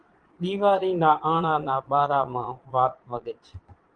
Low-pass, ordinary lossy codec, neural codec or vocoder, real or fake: 9.9 kHz; Opus, 24 kbps; vocoder, 22.05 kHz, 80 mel bands, Vocos; fake